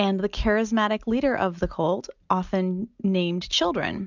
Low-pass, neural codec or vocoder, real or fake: 7.2 kHz; none; real